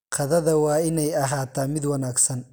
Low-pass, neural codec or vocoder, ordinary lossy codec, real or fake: none; none; none; real